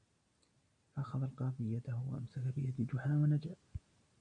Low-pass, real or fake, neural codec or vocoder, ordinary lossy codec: 9.9 kHz; real; none; AAC, 64 kbps